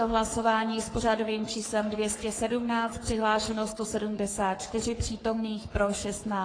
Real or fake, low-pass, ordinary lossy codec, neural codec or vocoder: fake; 9.9 kHz; AAC, 32 kbps; codec, 44.1 kHz, 3.4 kbps, Pupu-Codec